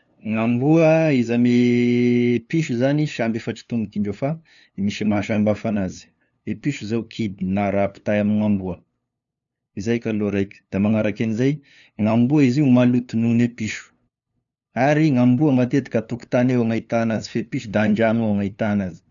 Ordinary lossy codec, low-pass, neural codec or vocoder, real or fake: none; 7.2 kHz; codec, 16 kHz, 2 kbps, FunCodec, trained on LibriTTS, 25 frames a second; fake